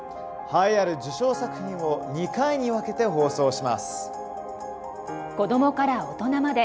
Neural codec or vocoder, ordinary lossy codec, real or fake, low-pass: none; none; real; none